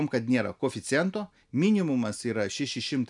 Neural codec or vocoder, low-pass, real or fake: none; 10.8 kHz; real